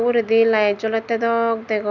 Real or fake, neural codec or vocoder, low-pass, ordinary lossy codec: real; none; 7.2 kHz; none